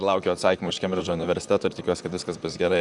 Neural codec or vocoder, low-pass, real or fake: vocoder, 44.1 kHz, 128 mel bands, Pupu-Vocoder; 10.8 kHz; fake